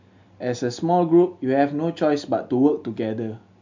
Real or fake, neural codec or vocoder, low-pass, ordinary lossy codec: real; none; 7.2 kHz; MP3, 64 kbps